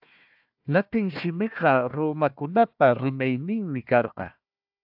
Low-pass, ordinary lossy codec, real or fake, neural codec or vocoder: 5.4 kHz; AAC, 48 kbps; fake; codec, 16 kHz, 1 kbps, FunCodec, trained on Chinese and English, 50 frames a second